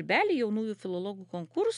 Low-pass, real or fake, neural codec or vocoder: 10.8 kHz; real; none